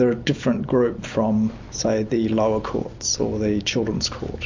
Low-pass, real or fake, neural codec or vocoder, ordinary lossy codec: 7.2 kHz; real; none; MP3, 64 kbps